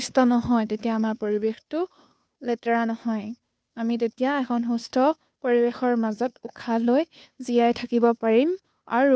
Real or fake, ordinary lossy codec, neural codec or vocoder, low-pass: fake; none; codec, 16 kHz, 2 kbps, FunCodec, trained on Chinese and English, 25 frames a second; none